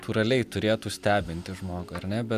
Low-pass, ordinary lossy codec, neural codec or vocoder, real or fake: 14.4 kHz; AAC, 96 kbps; none; real